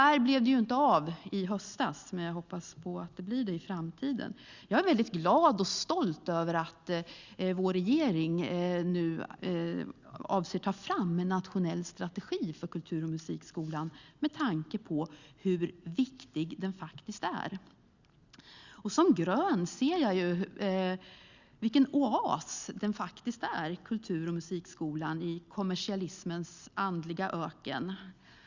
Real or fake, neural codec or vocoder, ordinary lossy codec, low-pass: real; none; Opus, 64 kbps; 7.2 kHz